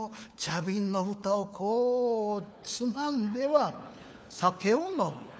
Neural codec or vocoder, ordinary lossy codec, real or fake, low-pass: codec, 16 kHz, 4 kbps, FunCodec, trained on LibriTTS, 50 frames a second; none; fake; none